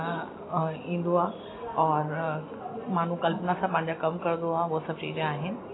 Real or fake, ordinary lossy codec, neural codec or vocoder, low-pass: real; AAC, 16 kbps; none; 7.2 kHz